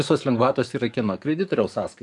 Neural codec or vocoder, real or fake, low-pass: codec, 44.1 kHz, 7.8 kbps, DAC; fake; 10.8 kHz